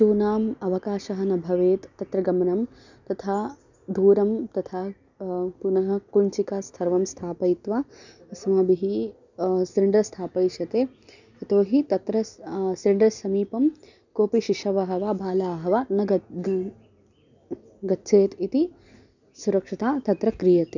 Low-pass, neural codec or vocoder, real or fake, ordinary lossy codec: 7.2 kHz; none; real; none